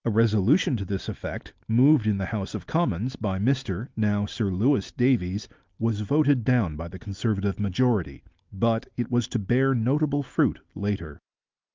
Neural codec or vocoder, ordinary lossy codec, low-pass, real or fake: none; Opus, 32 kbps; 7.2 kHz; real